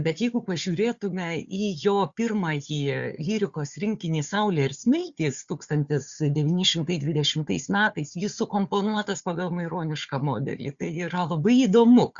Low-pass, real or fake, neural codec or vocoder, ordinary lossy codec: 7.2 kHz; fake; codec, 16 kHz, 4 kbps, FunCodec, trained on Chinese and English, 50 frames a second; Opus, 64 kbps